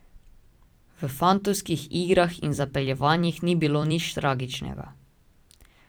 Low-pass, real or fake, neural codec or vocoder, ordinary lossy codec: none; fake; vocoder, 44.1 kHz, 128 mel bands every 256 samples, BigVGAN v2; none